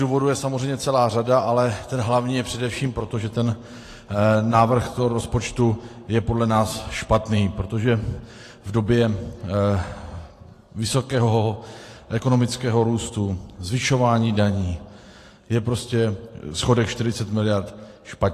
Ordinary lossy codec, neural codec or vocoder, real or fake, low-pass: AAC, 48 kbps; vocoder, 44.1 kHz, 128 mel bands every 512 samples, BigVGAN v2; fake; 14.4 kHz